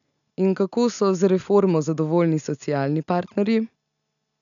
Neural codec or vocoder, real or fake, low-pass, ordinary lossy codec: none; real; 7.2 kHz; none